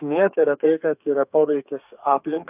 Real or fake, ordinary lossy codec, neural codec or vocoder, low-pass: fake; AAC, 32 kbps; codec, 32 kHz, 1.9 kbps, SNAC; 3.6 kHz